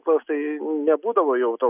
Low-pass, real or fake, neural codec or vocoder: 3.6 kHz; real; none